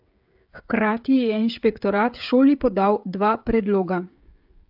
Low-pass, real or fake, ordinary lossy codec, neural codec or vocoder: 5.4 kHz; fake; AAC, 48 kbps; codec, 16 kHz, 16 kbps, FreqCodec, smaller model